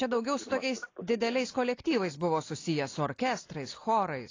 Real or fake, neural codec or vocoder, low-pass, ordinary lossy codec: real; none; 7.2 kHz; AAC, 32 kbps